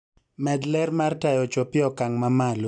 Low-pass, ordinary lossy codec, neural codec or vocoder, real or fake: 9.9 kHz; none; none; real